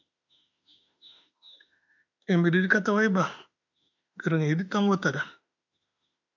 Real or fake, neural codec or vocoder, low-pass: fake; autoencoder, 48 kHz, 32 numbers a frame, DAC-VAE, trained on Japanese speech; 7.2 kHz